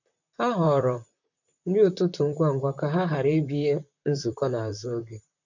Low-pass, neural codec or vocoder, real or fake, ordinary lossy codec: 7.2 kHz; vocoder, 44.1 kHz, 128 mel bands, Pupu-Vocoder; fake; none